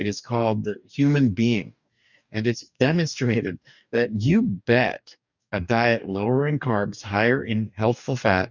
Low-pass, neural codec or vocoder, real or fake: 7.2 kHz; codec, 44.1 kHz, 2.6 kbps, DAC; fake